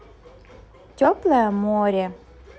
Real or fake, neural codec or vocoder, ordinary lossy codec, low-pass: real; none; none; none